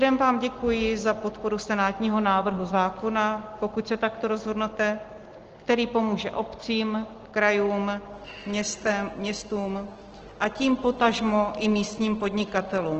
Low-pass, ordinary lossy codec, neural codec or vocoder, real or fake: 7.2 kHz; Opus, 16 kbps; none; real